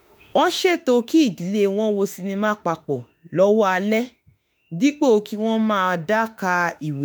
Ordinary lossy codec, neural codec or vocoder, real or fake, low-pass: none; autoencoder, 48 kHz, 32 numbers a frame, DAC-VAE, trained on Japanese speech; fake; none